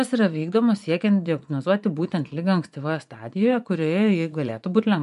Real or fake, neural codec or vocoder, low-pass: fake; vocoder, 24 kHz, 100 mel bands, Vocos; 10.8 kHz